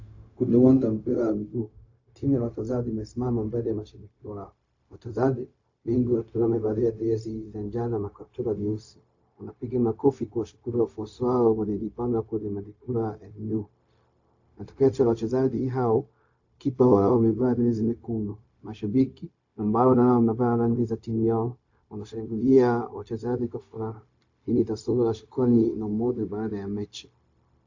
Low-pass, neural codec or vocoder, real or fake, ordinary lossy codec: 7.2 kHz; codec, 16 kHz, 0.4 kbps, LongCat-Audio-Codec; fake; AAC, 48 kbps